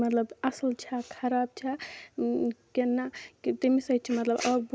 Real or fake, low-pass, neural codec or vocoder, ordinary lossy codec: real; none; none; none